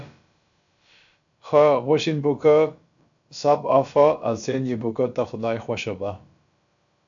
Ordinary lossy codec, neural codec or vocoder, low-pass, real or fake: AAC, 64 kbps; codec, 16 kHz, about 1 kbps, DyCAST, with the encoder's durations; 7.2 kHz; fake